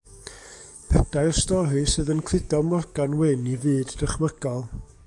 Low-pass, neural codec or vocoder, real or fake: 10.8 kHz; codec, 44.1 kHz, 7.8 kbps, DAC; fake